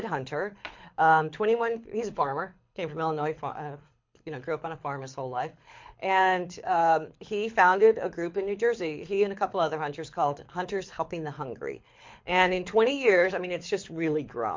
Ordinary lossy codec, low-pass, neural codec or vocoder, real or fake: MP3, 48 kbps; 7.2 kHz; codec, 24 kHz, 6 kbps, HILCodec; fake